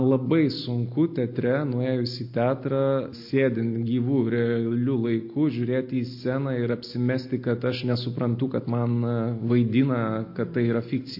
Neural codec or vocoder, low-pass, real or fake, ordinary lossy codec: none; 5.4 kHz; real; MP3, 32 kbps